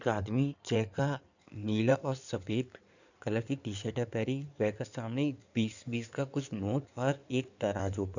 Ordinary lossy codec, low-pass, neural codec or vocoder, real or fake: none; 7.2 kHz; codec, 16 kHz in and 24 kHz out, 2.2 kbps, FireRedTTS-2 codec; fake